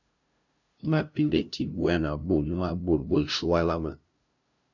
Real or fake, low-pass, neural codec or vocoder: fake; 7.2 kHz; codec, 16 kHz, 0.5 kbps, FunCodec, trained on LibriTTS, 25 frames a second